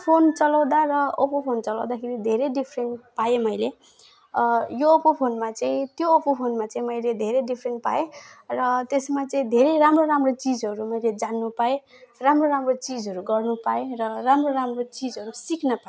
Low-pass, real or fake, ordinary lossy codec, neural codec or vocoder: none; real; none; none